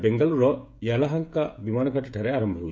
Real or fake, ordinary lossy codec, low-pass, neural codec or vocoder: fake; none; none; codec, 16 kHz, 16 kbps, FreqCodec, smaller model